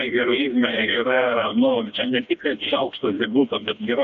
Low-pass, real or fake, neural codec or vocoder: 7.2 kHz; fake; codec, 16 kHz, 1 kbps, FreqCodec, smaller model